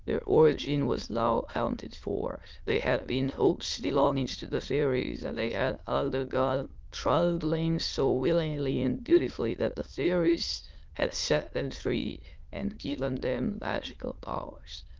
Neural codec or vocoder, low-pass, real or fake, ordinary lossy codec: autoencoder, 22.05 kHz, a latent of 192 numbers a frame, VITS, trained on many speakers; 7.2 kHz; fake; Opus, 32 kbps